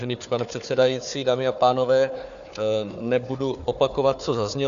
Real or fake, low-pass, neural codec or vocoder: fake; 7.2 kHz; codec, 16 kHz, 4 kbps, FunCodec, trained on Chinese and English, 50 frames a second